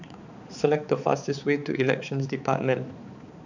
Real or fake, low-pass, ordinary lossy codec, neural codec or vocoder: fake; 7.2 kHz; none; codec, 16 kHz, 4 kbps, X-Codec, HuBERT features, trained on balanced general audio